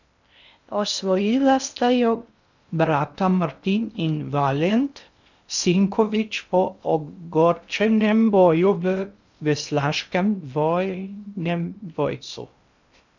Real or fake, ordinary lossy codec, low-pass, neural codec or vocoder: fake; none; 7.2 kHz; codec, 16 kHz in and 24 kHz out, 0.6 kbps, FocalCodec, streaming, 2048 codes